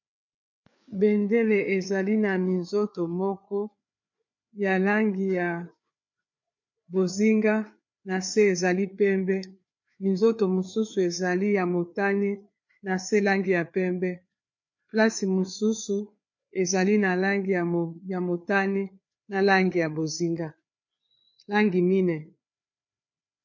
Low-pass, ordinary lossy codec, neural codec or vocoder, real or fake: 7.2 kHz; MP3, 48 kbps; codec, 16 kHz, 4 kbps, FreqCodec, larger model; fake